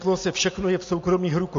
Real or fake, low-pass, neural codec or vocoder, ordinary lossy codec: real; 7.2 kHz; none; MP3, 48 kbps